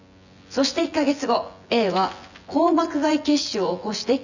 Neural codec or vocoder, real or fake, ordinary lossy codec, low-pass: vocoder, 24 kHz, 100 mel bands, Vocos; fake; none; 7.2 kHz